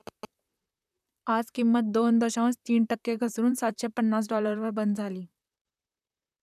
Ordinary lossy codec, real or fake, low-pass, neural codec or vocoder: none; fake; 14.4 kHz; vocoder, 44.1 kHz, 128 mel bands, Pupu-Vocoder